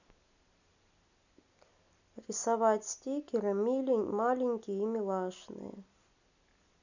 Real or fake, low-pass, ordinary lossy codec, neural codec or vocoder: real; 7.2 kHz; none; none